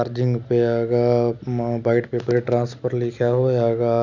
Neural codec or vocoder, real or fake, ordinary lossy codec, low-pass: none; real; none; 7.2 kHz